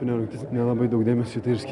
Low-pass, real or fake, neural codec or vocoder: 10.8 kHz; real; none